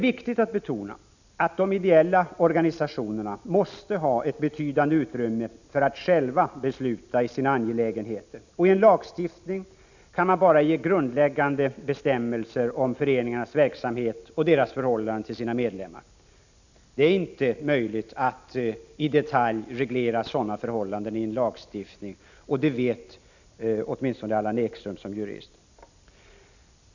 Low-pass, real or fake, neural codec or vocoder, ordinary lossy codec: 7.2 kHz; real; none; none